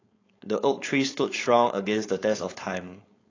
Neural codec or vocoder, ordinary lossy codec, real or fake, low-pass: codec, 16 kHz, 4.8 kbps, FACodec; AAC, 32 kbps; fake; 7.2 kHz